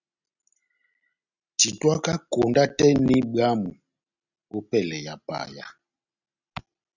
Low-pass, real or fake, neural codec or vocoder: 7.2 kHz; real; none